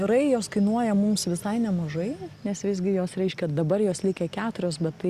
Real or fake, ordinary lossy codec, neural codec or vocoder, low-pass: real; Opus, 64 kbps; none; 14.4 kHz